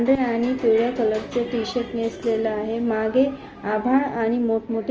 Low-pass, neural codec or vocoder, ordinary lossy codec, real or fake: 7.2 kHz; none; Opus, 24 kbps; real